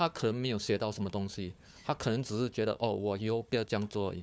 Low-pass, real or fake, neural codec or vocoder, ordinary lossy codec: none; fake; codec, 16 kHz, 4 kbps, FunCodec, trained on Chinese and English, 50 frames a second; none